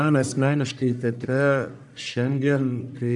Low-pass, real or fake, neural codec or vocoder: 10.8 kHz; fake; codec, 44.1 kHz, 1.7 kbps, Pupu-Codec